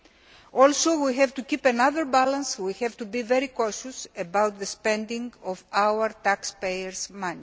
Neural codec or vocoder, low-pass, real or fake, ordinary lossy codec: none; none; real; none